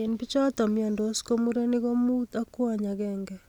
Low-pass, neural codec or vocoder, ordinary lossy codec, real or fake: 19.8 kHz; none; none; real